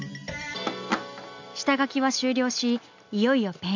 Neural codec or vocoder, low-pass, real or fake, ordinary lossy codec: none; 7.2 kHz; real; none